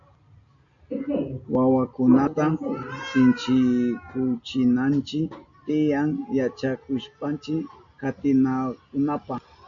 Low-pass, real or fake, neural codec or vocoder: 7.2 kHz; real; none